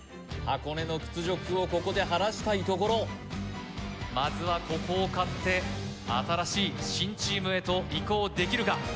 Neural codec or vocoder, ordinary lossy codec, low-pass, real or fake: none; none; none; real